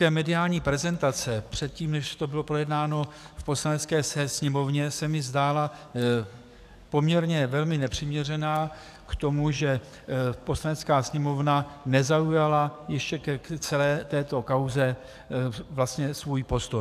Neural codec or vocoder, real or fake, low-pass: codec, 44.1 kHz, 7.8 kbps, DAC; fake; 14.4 kHz